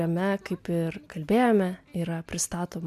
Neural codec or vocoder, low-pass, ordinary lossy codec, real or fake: none; 14.4 kHz; MP3, 96 kbps; real